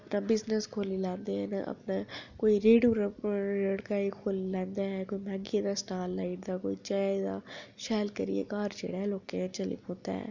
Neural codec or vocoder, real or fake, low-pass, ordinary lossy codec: none; real; 7.2 kHz; none